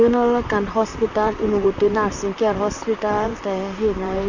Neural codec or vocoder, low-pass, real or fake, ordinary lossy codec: vocoder, 44.1 kHz, 128 mel bands, Pupu-Vocoder; 7.2 kHz; fake; Opus, 64 kbps